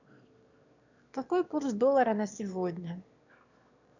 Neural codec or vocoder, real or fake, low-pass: autoencoder, 22.05 kHz, a latent of 192 numbers a frame, VITS, trained on one speaker; fake; 7.2 kHz